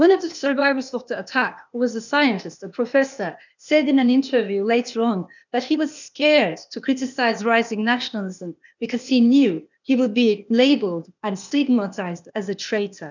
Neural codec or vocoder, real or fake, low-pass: codec, 16 kHz, 0.8 kbps, ZipCodec; fake; 7.2 kHz